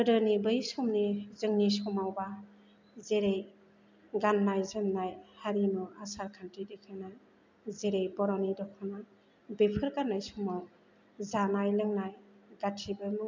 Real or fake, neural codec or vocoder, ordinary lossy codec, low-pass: real; none; MP3, 64 kbps; 7.2 kHz